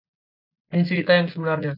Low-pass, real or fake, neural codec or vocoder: 5.4 kHz; real; none